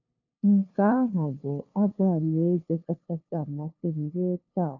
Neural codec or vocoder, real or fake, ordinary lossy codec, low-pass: codec, 16 kHz, 2 kbps, FunCodec, trained on LibriTTS, 25 frames a second; fake; none; 7.2 kHz